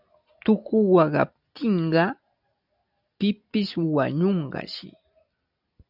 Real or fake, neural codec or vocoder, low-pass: real; none; 5.4 kHz